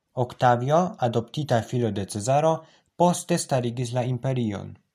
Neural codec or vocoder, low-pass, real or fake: none; 14.4 kHz; real